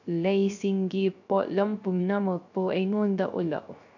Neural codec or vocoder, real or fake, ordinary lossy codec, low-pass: codec, 16 kHz, 0.3 kbps, FocalCodec; fake; none; 7.2 kHz